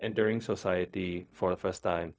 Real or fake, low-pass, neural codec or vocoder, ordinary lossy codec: fake; none; codec, 16 kHz, 0.4 kbps, LongCat-Audio-Codec; none